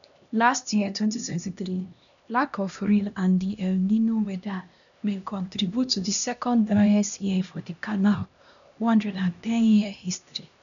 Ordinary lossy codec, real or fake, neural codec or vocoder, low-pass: none; fake; codec, 16 kHz, 1 kbps, X-Codec, HuBERT features, trained on LibriSpeech; 7.2 kHz